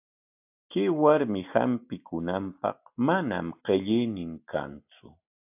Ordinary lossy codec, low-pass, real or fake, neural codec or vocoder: AAC, 32 kbps; 3.6 kHz; real; none